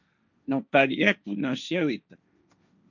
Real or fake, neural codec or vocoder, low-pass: fake; codec, 16 kHz, 1.1 kbps, Voila-Tokenizer; 7.2 kHz